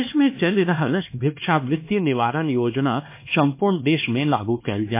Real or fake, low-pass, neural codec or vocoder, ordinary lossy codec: fake; 3.6 kHz; codec, 16 kHz, 2 kbps, X-Codec, HuBERT features, trained on LibriSpeech; MP3, 24 kbps